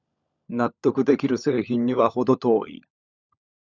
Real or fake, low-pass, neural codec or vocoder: fake; 7.2 kHz; codec, 16 kHz, 16 kbps, FunCodec, trained on LibriTTS, 50 frames a second